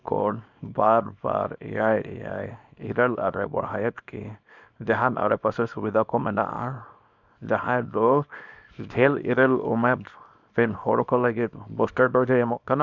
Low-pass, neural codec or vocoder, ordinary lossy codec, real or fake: 7.2 kHz; codec, 24 kHz, 0.9 kbps, WavTokenizer, small release; none; fake